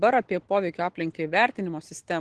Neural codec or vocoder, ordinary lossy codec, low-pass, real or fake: none; Opus, 16 kbps; 10.8 kHz; real